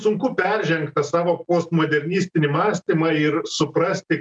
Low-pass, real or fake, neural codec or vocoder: 9.9 kHz; real; none